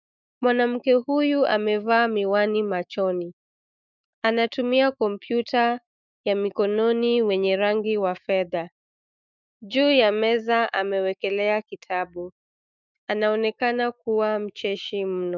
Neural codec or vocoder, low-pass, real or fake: autoencoder, 48 kHz, 128 numbers a frame, DAC-VAE, trained on Japanese speech; 7.2 kHz; fake